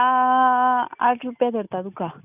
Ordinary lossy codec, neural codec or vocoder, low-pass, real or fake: none; none; 3.6 kHz; real